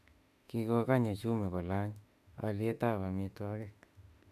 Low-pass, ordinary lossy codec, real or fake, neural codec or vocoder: 14.4 kHz; none; fake; autoencoder, 48 kHz, 32 numbers a frame, DAC-VAE, trained on Japanese speech